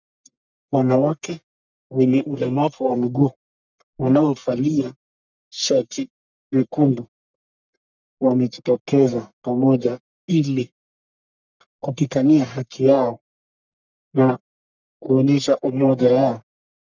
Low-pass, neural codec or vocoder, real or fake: 7.2 kHz; codec, 44.1 kHz, 1.7 kbps, Pupu-Codec; fake